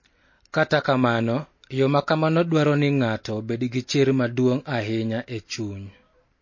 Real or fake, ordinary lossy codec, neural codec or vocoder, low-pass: real; MP3, 32 kbps; none; 7.2 kHz